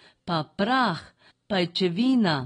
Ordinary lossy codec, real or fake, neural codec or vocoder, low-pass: AAC, 32 kbps; real; none; 9.9 kHz